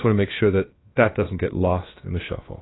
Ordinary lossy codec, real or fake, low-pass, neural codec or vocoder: AAC, 16 kbps; fake; 7.2 kHz; codec, 16 kHz, about 1 kbps, DyCAST, with the encoder's durations